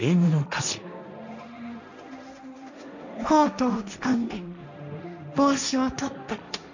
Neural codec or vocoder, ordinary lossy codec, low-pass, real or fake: codec, 16 kHz, 1.1 kbps, Voila-Tokenizer; none; 7.2 kHz; fake